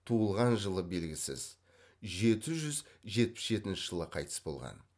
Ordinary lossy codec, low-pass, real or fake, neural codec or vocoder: none; none; real; none